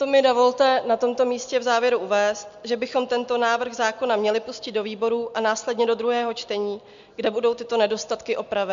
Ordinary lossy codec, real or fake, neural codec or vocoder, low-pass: MP3, 64 kbps; real; none; 7.2 kHz